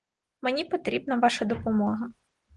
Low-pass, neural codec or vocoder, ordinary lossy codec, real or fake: 10.8 kHz; none; Opus, 24 kbps; real